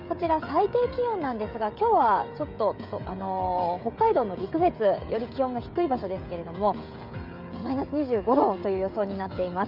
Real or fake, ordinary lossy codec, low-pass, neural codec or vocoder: fake; none; 5.4 kHz; codec, 16 kHz, 16 kbps, FreqCodec, smaller model